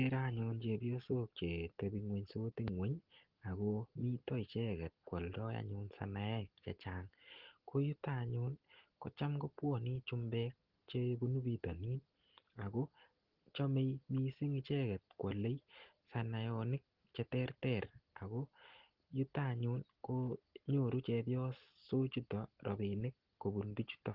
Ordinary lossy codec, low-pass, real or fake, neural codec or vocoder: Opus, 16 kbps; 5.4 kHz; real; none